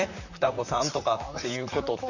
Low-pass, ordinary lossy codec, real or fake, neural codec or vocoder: 7.2 kHz; none; fake; vocoder, 44.1 kHz, 128 mel bands, Pupu-Vocoder